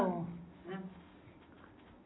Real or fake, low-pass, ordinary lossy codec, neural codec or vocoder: real; 7.2 kHz; AAC, 16 kbps; none